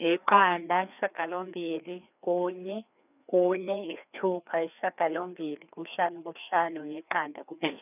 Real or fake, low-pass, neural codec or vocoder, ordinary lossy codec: fake; 3.6 kHz; codec, 16 kHz, 2 kbps, FreqCodec, larger model; none